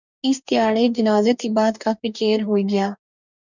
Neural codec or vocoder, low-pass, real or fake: codec, 44.1 kHz, 2.6 kbps, DAC; 7.2 kHz; fake